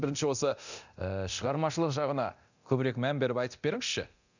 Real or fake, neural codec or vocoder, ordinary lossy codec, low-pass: fake; codec, 24 kHz, 0.9 kbps, DualCodec; none; 7.2 kHz